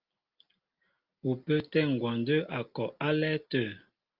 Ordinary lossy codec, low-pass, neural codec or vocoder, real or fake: Opus, 32 kbps; 5.4 kHz; none; real